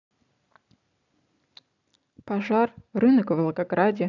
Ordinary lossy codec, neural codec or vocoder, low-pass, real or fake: none; none; 7.2 kHz; real